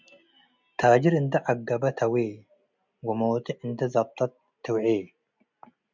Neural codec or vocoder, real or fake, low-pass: none; real; 7.2 kHz